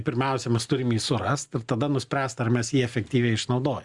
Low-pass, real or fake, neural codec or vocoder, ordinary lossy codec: 10.8 kHz; real; none; Opus, 64 kbps